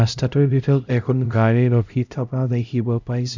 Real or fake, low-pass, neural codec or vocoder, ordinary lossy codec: fake; 7.2 kHz; codec, 16 kHz, 0.5 kbps, X-Codec, HuBERT features, trained on LibriSpeech; none